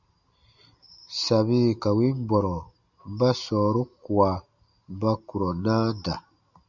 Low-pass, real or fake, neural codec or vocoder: 7.2 kHz; real; none